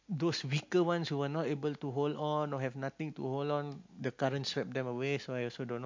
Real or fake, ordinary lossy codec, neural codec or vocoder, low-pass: real; MP3, 48 kbps; none; 7.2 kHz